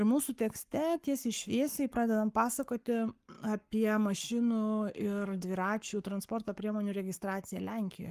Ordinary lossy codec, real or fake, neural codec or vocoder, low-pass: Opus, 32 kbps; fake; codec, 44.1 kHz, 7.8 kbps, Pupu-Codec; 14.4 kHz